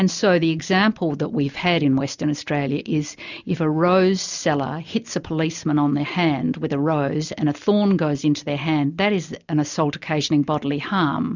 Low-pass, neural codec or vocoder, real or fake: 7.2 kHz; none; real